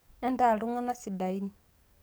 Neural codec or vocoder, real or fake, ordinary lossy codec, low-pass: codec, 44.1 kHz, 7.8 kbps, DAC; fake; none; none